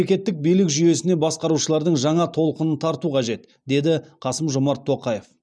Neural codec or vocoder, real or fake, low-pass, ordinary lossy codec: none; real; none; none